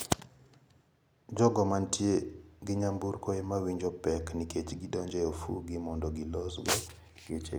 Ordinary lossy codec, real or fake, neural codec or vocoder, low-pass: none; real; none; none